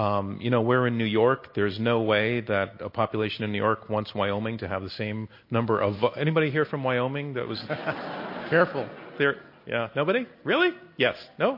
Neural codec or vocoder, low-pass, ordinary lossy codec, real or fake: none; 5.4 kHz; MP3, 32 kbps; real